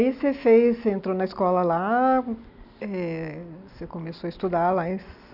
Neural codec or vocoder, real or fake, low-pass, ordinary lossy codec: none; real; 5.4 kHz; none